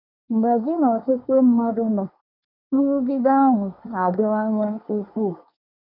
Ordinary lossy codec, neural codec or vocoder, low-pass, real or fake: none; codec, 24 kHz, 1 kbps, SNAC; 5.4 kHz; fake